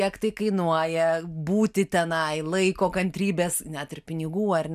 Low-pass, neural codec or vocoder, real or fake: 14.4 kHz; none; real